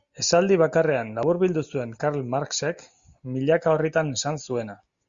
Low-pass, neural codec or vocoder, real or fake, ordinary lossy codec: 7.2 kHz; none; real; Opus, 64 kbps